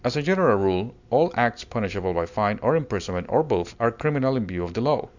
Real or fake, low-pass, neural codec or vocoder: real; 7.2 kHz; none